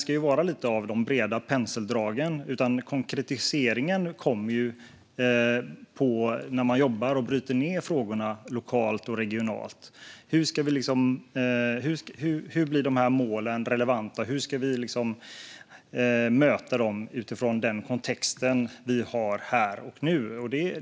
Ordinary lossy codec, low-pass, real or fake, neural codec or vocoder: none; none; real; none